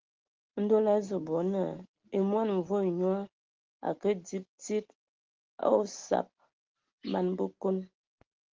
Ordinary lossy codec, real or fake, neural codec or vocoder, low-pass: Opus, 16 kbps; real; none; 7.2 kHz